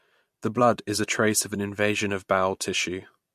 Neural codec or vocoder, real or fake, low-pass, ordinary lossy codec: vocoder, 44.1 kHz, 128 mel bands every 256 samples, BigVGAN v2; fake; 14.4 kHz; MP3, 64 kbps